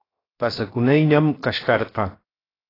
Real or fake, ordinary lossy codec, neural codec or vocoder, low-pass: fake; AAC, 24 kbps; codec, 16 kHz, 0.7 kbps, FocalCodec; 5.4 kHz